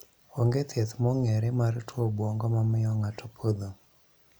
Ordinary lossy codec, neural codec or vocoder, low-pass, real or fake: none; none; none; real